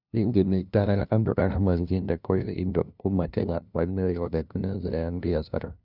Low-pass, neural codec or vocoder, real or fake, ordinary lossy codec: 5.4 kHz; codec, 16 kHz, 0.5 kbps, FunCodec, trained on LibriTTS, 25 frames a second; fake; none